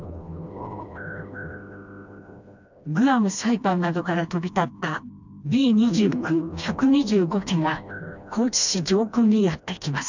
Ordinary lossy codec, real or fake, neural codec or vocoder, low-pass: none; fake; codec, 16 kHz, 1 kbps, FreqCodec, smaller model; 7.2 kHz